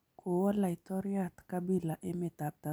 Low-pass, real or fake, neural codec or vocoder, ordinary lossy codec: none; real; none; none